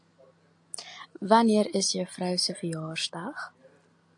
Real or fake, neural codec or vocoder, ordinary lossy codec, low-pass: real; none; AAC, 64 kbps; 10.8 kHz